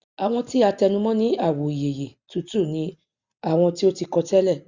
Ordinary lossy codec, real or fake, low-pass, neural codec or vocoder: Opus, 64 kbps; real; 7.2 kHz; none